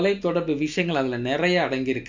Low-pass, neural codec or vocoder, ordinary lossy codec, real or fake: 7.2 kHz; none; MP3, 64 kbps; real